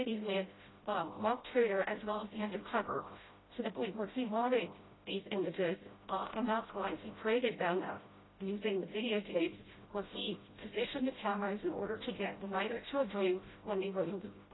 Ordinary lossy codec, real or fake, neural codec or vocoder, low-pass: AAC, 16 kbps; fake; codec, 16 kHz, 0.5 kbps, FreqCodec, smaller model; 7.2 kHz